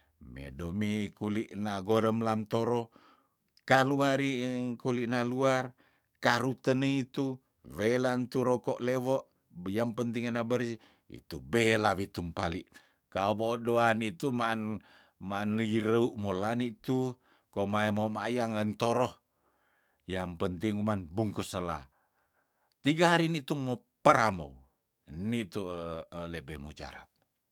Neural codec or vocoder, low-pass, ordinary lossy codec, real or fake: codec, 44.1 kHz, 7.8 kbps, DAC; 19.8 kHz; none; fake